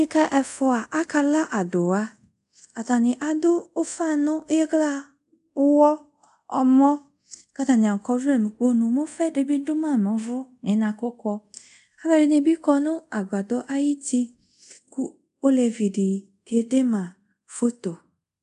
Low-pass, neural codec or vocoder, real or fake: 10.8 kHz; codec, 24 kHz, 0.5 kbps, DualCodec; fake